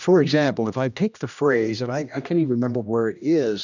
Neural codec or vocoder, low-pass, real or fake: codec, 16 kHz, 1 kbps, X-Codec, HuBERT features, trained on general audio; 7.2 kHz; fake